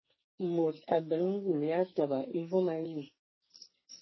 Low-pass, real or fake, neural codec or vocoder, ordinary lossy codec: 7.2 kHz; fake; codec, 44.1 kHz, 2.6 kbps, SNAC; MP3, 24 kbps